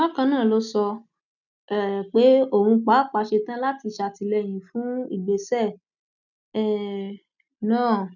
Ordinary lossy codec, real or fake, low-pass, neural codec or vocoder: none; real; 7.2 kHz; none